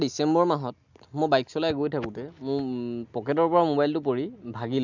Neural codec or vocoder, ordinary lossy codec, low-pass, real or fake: none; none; 7.2 kHz; real